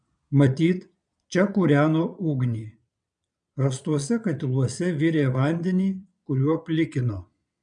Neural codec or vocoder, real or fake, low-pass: vocoder, 22.05 kHz, 80 mel bands, Vocos; fake; 9.9 kHz